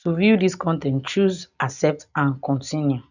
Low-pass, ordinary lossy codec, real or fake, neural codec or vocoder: 7.2 kHz; none; real; none